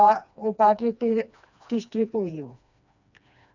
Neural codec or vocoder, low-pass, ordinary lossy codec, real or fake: codec, 16 kHz, 2 kbps, FreqCodec, smaller model; 7.2 kHz; none; fake